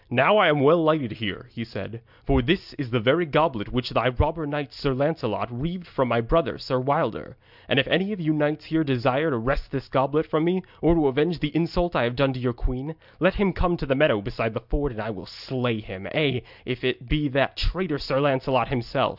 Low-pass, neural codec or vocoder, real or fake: 5.4 kHz; none; real